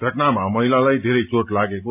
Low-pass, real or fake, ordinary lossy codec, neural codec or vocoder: 3.6 kHz; real; AAC, 32 kbps; none